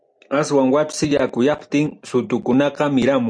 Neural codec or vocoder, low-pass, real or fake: none; 9.9 kHz; real